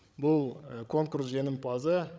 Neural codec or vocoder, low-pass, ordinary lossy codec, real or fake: codec, 16 kHz, 16 kbps, FreqCodec, larger model; none; none; fake